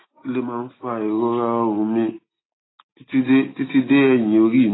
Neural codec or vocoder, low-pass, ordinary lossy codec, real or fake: none; 7.2 kHz; AAC, 16 kbps; real